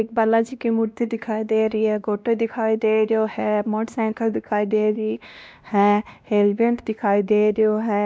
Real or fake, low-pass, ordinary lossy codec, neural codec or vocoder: fake; none; none; codec, 16 kHz, 1 kbps, X-Codec, WavLM features, trained on Multilingual LibriSpeech